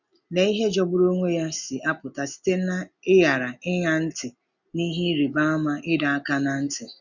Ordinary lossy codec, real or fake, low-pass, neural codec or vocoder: none; real; 7.2 kHz; none